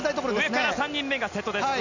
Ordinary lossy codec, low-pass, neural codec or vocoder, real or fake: none; 7.2 kHz; none; real